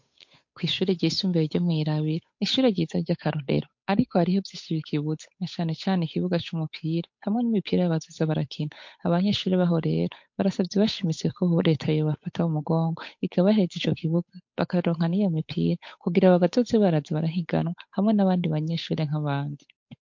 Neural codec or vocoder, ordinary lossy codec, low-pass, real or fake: codec, 16 kHz, 8 kbps, FunCodec, trained on Chinese and English, 25 frames a second; MP3, 48 kbps; 7.2 kHz; fake